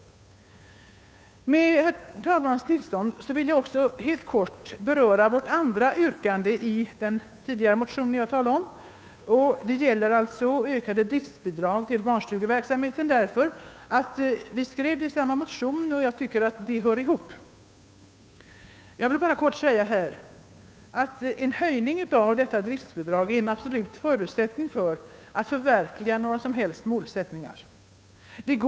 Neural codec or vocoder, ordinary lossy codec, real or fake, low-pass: codec, 16 kHz, 2 kbps, FunCodec, trained on Chinese and English, 25 frames a second; none; fake; none